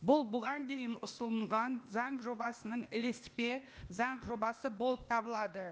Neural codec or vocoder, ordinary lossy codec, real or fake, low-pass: codec, 16 kHz, 0.8 kbps, ZipCodec; none; fake; none